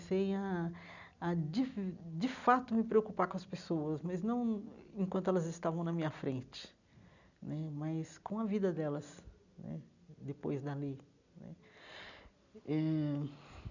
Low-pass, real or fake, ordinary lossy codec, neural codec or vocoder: 7.2 kHz; real; none; none